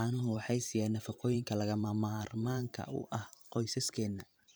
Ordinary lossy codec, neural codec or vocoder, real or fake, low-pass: none; vocoder, 44.1 kHz, 128 mel bands every 512 samples, BigVGAN v2; fake; none